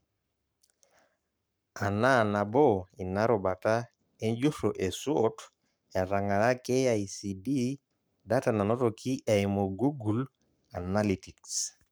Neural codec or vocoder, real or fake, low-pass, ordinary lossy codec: codec, 44.1 kHz, 7.8 kbps, Pupu-Codec; fake; none; none